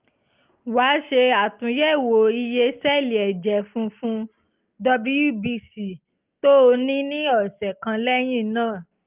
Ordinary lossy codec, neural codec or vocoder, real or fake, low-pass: Opus, 32 kbps; none; real; 3.6 kHz